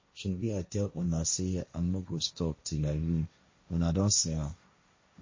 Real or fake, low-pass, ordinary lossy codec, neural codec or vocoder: fake; 7.2 kHz; MP3, 32 kbps; codec, 16 kHz, 1.1 kbps, Voila-Tokenizer